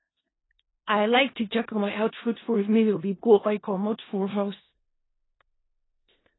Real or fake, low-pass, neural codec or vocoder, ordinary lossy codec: fake; 7.2 kHz; codec, 16 kHz in and 24 kHz out, 0.4 kbps, LongCat-Audio-Codec, four codebook decoder; AAC, 16 kbps